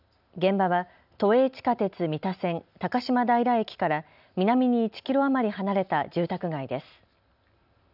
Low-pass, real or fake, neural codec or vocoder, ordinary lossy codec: 5.4 kHz; real; none; none